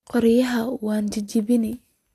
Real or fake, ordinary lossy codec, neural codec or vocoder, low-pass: real; AAC, 64 kbps; none; 14.4 kHz